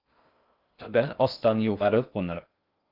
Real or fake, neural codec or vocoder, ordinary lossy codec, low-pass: fake; codec, 16 kHz in and 24 kHz out, 0.6 kbps, FocalCodec, streaming, 2048 codes; Opus, 24 kbps; 5.4 kHz